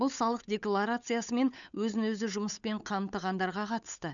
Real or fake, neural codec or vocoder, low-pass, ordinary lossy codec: fake; codec, 16 kHz, 8 kbps, FunCodec, trained on Chinese and English, 25 frames a second; 7.2 kHz; none